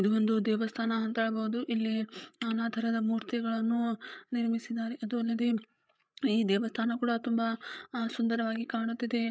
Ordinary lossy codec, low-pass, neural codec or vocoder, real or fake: none; none; codec, 16 kHz, 8 kbps, FreqCodec, larger model; fake